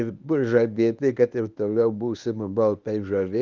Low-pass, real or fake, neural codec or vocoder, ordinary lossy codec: 7.2 kHz; fake; codec, 24 kHz, 0.9 kbps, WavTokenizer, medium speech release version 1; Opus, 24 kbps